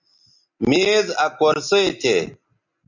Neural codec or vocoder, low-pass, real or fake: none; 7.2 kHz; real